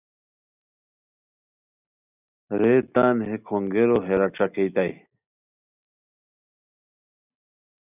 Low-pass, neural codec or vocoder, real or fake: 3.6 kHz; none; real